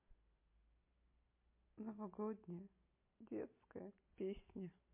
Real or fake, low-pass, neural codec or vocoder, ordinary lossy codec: real; 3.6 kHz; none; MP3, 24 kbps